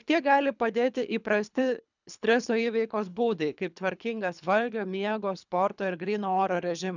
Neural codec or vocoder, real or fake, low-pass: codec, 24 kHz, 3 kbps, HILCodec; fake; 7.2 kHz